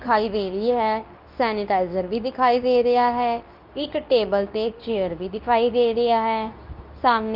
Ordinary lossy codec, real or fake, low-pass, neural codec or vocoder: Opus, 24 kbps; fake; 5.4 kHz; codec, 24 kHz, 0.9 kbps, WavTokenizer, medium speech release version 2